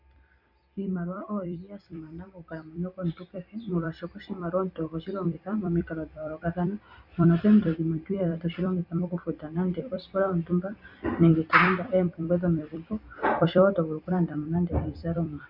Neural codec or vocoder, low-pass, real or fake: vocoder, 44.1 kHz, 128 mel bands every 256 samples, BigVGAN v2; 5.4 kHz; fake